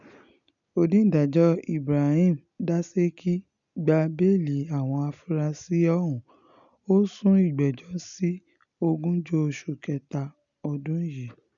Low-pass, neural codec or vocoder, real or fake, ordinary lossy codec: 7.2 kHz; none; real; none